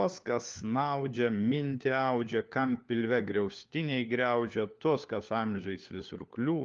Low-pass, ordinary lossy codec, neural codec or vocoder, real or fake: 7.2 kHz; Opus, 32 kbps; codec, 16 kHz, 4 kbps, FunCodec, trained on LibriTTS, 50 frames a second; fake